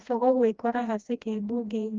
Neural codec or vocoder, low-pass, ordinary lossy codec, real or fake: codec, 16 kHz, 1 kbps, FreqCodec, smaller model; 7.2 kHz; Opus, 32 kbps; fake